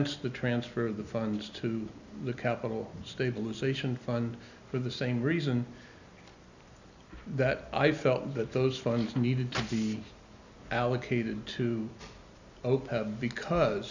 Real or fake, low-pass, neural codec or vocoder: real; 7.2 kHz; none